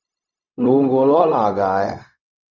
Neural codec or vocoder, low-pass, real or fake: codec, 16 kHz, 0.4 kbps, LongCat-Audio-Codec; 7.2 kHz; fake